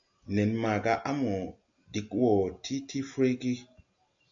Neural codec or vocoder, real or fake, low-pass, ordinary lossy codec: none; real; 7.2 kHz; MP3, 64 kbps